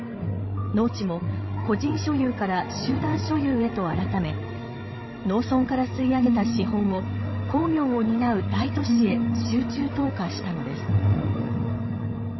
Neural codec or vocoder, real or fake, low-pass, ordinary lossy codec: codec, 16 kHz, 16 kbps, FreqCodec, larger model; fake; 7.2 kHz; MP3, 24 kbps